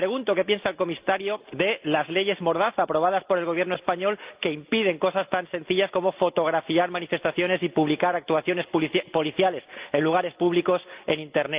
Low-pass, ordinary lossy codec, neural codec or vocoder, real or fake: 3.6 kHz; Opus, 32 kbps; none; real